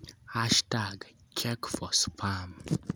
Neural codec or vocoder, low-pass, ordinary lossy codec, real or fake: none; none; none; real